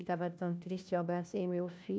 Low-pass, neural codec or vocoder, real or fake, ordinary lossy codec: none; codec, 16 kHz, 1 kbps, FunCodec, trained on LibriTTS, 50 frames a second; fake; none